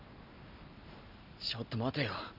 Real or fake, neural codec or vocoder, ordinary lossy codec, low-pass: real; none; none; 5.4 kHz